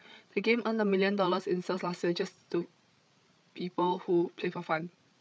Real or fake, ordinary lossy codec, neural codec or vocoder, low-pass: fake; none; codec, 16 kHz, 8 kbps, FreqCodec, larger model; none